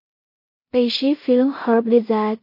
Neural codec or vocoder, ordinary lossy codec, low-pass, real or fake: codec, 16 kHz in and 24 kHz out, 0.4 kbps, LongCat-Audio-Codec, two codebook decoder; MP3, 32 kbps; 5.4 kHz; fake